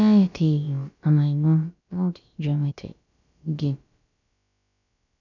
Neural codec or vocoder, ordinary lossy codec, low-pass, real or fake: codec, 16 kHz, about 1 kbps, DyCAST, with the encoder's durations; none; 7.2 kHz; fake